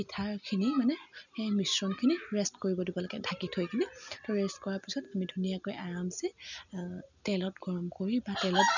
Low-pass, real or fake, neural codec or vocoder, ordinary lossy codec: 7.2 kHz; real; none; none